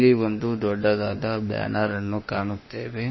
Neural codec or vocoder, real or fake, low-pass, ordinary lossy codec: autoencoder, 48 kHz, 32 numbers a frame, DAC-VAE, trained on Japanese speech; fake; 7.2 kHz; MP3, 24 kbps